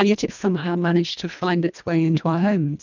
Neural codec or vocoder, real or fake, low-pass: codec, 24 kHz, 1.5 kbps, HILCodec; fake; 7.2 kHz